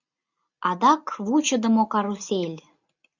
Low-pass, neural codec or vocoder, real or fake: 7.2 kHz; none; real